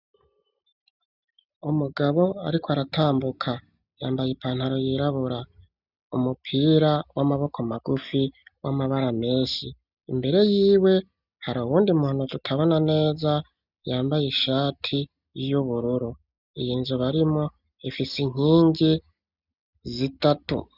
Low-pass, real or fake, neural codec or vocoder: 5.4 kHz; real; none